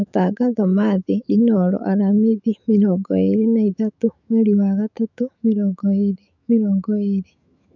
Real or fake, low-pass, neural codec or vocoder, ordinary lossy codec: fake; 7.2 kHz; autoencoder, 48 kHz, 128 numbers a frame, DAC-VAE, trained on Japanese speech; none